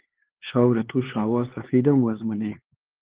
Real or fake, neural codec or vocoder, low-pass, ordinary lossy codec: fake; codec, 16 kHz, 4 kbps, X-Codec, HuBERT features, trained on LibriSpeech; 3.6 kHz; Opus, 16 kbps